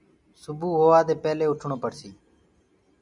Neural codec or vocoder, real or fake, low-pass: none; real; 10.8 kHz